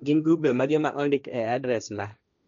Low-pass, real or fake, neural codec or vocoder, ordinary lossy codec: 7.2 kHz; fake; codec, 16 kHz, 1.1 kbps, Voila-Tokenizer; none